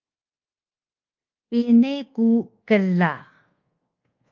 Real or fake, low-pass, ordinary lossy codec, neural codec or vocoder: fake; 7.2 kHz; Opus, 32 kbps; codec, 24 kHz, 1.2 kbps, DualCodec